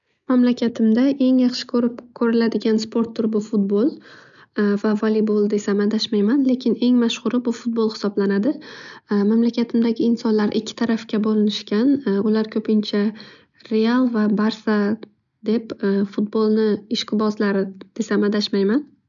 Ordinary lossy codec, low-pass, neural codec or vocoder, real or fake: none; 7.2 kHz; none; real